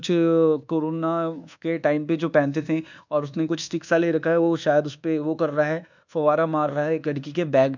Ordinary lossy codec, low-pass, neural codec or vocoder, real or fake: none; 7.2 kHz; codec, 24 kHz, 1.2 kbps, DualCodec; fake